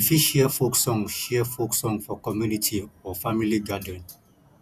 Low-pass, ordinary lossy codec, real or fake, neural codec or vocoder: 14.4 kHz; none; fake; vocoder, 48 kHz, 128 mel bands, Vocos